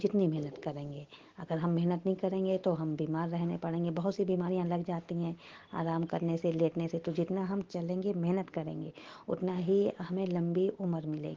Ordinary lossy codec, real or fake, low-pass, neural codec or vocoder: Opus, 16 kbps; real; 7.2 kHz; none